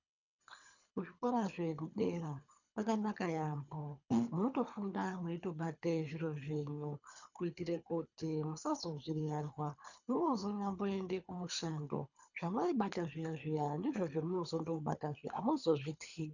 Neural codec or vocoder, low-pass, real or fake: codec, 24 kHz, 3 kbps, HILCodec; 7.2 kHz; fake